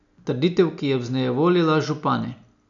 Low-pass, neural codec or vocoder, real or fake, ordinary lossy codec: 7.2 kHz; none; real; none